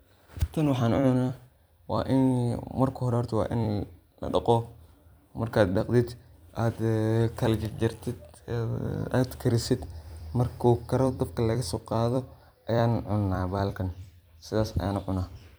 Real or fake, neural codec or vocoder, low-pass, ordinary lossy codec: fake; vocoder, 44.1 kHz, 128 mel bands every 256 samples, BigVGAN v2; none; none